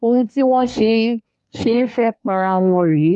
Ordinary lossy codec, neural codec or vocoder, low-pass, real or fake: none; codec, 24 kHz, 1 kbps, SNAC; 10.8 kHz; fake